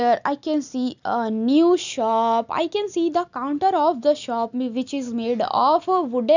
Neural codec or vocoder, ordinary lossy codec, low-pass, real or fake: none; none; 7.2 kHz; real